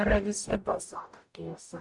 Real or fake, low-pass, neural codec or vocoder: fake; 10.8 kHz; codec, 44.1 kHz, 0.9 kbps, DAC